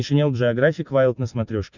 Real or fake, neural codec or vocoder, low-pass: real; none; 7.2 kHz